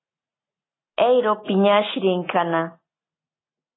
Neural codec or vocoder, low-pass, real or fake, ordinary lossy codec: none; 7.2 kHz; real; AAC, 16 kbps